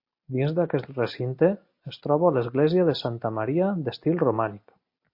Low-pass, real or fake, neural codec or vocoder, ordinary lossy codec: 5.4 kHz; real; none; MP3, 32 kbps